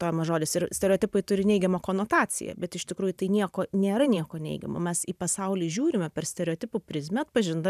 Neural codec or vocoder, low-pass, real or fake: vocoder, 44.1 kHz, 128 mel bands every 512 samples, BigVGAN v2; 14.4 kHz; fake